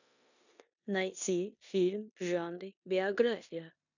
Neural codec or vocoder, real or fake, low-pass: codec, 16 kHz in and 24 kHz out, 0.9 kbps, LongCat-Audio-Codec, four codebook decoder; fake; 7.2 kHz